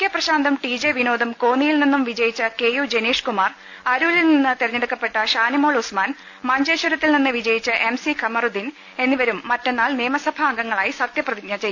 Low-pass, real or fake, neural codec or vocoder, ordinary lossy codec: none; real; none; none